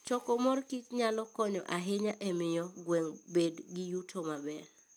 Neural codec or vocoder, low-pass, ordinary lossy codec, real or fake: none; none; none; real